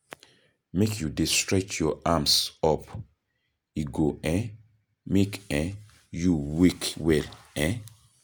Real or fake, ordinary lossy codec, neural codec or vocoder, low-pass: real; none; none; none